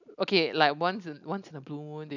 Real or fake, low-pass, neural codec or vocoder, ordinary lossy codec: real; 7.2 kHz; none; none